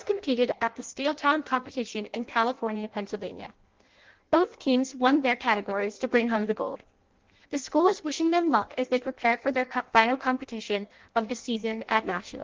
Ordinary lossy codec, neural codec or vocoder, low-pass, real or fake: Opus, 16 kbps; codec, 16 kHz in and 24 kHz out, 0.6 kbps, FireRedTTS-2 codec; 7.2 kHz; fake